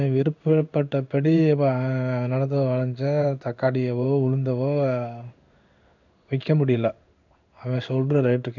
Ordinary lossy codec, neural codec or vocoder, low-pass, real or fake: none; codec, 16 kHz in and 24 kHz out, 1 kbps, XY-Tokenizer; 7.2 kHz; fake